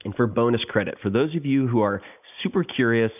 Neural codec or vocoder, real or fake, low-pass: none; real; 3.6 kHz